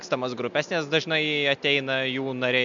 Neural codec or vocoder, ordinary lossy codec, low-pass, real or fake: none; MP3, 64 kbps; 7.2 kHz; real